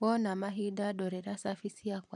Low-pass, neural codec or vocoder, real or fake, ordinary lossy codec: 10.8 kHz; vocoder, 44.1 kHz, 128 mel bands every 512 samples, BigVGAN v2; fake; none